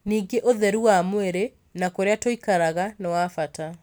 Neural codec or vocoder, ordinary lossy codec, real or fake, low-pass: none; none; real; none